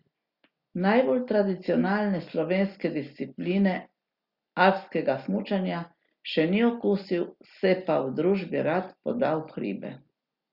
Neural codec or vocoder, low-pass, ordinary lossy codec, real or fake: none; 5.4 kHz; Opus, 64 kbps; real